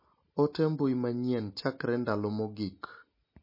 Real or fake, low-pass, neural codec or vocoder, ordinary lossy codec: real; 5.4 kHz; none; MP3, 24 kbps